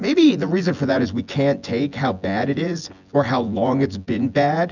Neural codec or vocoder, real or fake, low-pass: vocoder, 24 kHz, 100 mel bands, Vocos; fake; 7.2 kHz